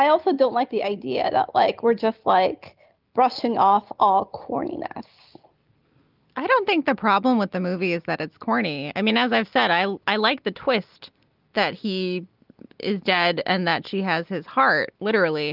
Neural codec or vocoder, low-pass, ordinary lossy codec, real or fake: none; 5.4 kHz; Opus, 32 kbps; real